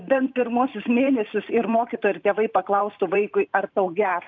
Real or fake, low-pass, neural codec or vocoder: fake; 7.2 kHz; vocoder, 44.1 kHz, 128 mel bands every 512 samples, BigVGAN v2